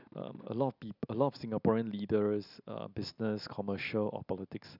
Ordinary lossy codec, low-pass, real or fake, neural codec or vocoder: none; 5.4 kHz; real; none